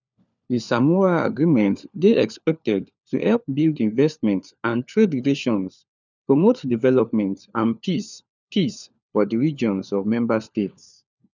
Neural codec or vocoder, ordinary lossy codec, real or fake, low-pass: codec, 16 kHz, 4 kbps, FunCodec, trained on LibriTTS, 50 frames a second; none; fake; 7.2 kHz